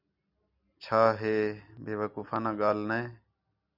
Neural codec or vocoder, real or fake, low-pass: none; real; 5.4 kHz